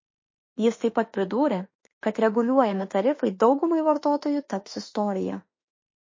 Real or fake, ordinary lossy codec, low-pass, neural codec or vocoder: fake; MP3, 32 kbps; 7.2 kHz; autoencoder, 48 kHz, 32 numbers a frame, DAC-VAE, trained on Japanese speech